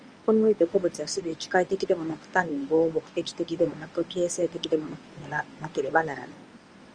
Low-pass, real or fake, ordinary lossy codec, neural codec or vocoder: 9.9 kHz; fake; Opus, 24 kbps; codec, 24 kHz, 0.9 kbps, WavTokenizer, medium speech release version 1